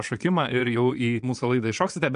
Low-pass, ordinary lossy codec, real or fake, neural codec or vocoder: 9.9 kHz; MP3, 64 kbps; fake; vocoder, 22.05 kHz, 80 mel bands, Vocos